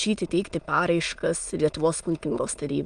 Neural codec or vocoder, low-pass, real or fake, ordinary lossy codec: autoencoder, 22.05 kHz, a latent of 192 numbers a frame, VITS, trained on many speakers; 9.9 kHz; fake; Opus, 32 kbps